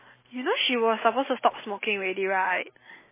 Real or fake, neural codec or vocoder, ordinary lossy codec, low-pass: real; none; MP3, 16 kbps; 3.6 kHz